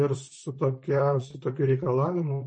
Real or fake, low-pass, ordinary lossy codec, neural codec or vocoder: fake; 9.9 kHz; MP3, 32 kbps; vocoder, 44.1 kHz, 128 mel bands, Pupu-Vocoder